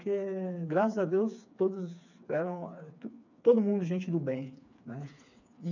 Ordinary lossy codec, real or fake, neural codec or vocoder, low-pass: none; fake; codec, 16 kHz, 4 kbps, FreqCodec, smaller model; 7.2 kHz